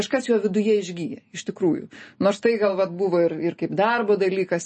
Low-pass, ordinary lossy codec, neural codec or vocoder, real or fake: 10.8 kHz; MP3, 32 kbps; none; real